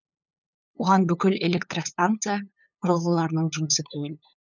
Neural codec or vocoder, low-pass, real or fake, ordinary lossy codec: codec, 16 kHz, 8 kbps, FunCodec, trained on LibriTTS, 25 frames a second; 7.2 kHz; fake; none